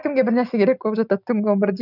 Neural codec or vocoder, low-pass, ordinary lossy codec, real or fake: none; 5.4 kHz; none; real